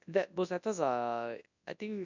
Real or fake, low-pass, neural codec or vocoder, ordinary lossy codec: fake; 7.2 kHz; codec, 24 kHz, 0.9 kbps, WavTokenizer, large speech release; none